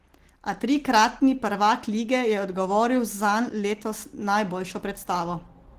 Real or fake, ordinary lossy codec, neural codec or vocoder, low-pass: real; Opus, 16 kbps; none; 14.4 kHz